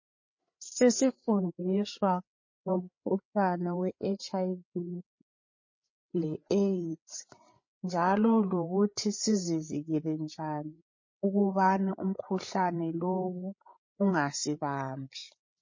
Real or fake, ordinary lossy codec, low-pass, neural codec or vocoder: fake; MP3, 32 kbps; 7.2 kHz; codec, 16 kHz, 8 kbps, FreqCodec, larger model